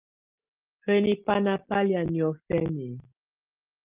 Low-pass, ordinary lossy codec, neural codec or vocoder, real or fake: 3.6 kHz; Opus, 32 kbps; none; real